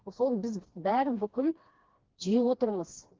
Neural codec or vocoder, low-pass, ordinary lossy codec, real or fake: codec, 16 kHz, 2 kbps, FreqCodec, smaller model; 7.2 kHz; Opus, 16 kbps; fake